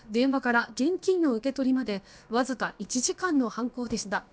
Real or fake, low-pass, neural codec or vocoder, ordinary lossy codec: fake; none; codec, 16 kHz, about 1 kbps, DyCAST, with the encoder's durations; none